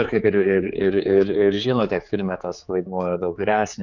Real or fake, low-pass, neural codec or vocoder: fake; 7.2 kHz; codec, 16 kHz, 4 kbps, X-Codec, HuBERT features, trained on general audio